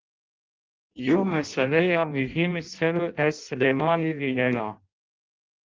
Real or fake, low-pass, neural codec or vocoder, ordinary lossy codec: fake; 7.2 kHz; codec, 16 kHz in and 24 kHz out, 0.6 kbps, FireRedTTS-2 codec; Opus, 32 kbps